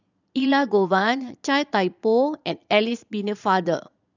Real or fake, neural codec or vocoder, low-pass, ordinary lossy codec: fake; vocoder, 22.05 kHz, 80 mel bands, Vocos; 7.2 kHz; none